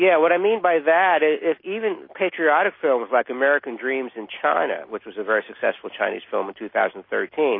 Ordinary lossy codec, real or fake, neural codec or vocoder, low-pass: MP3, 24 kbps; real; none; 5.4 kHz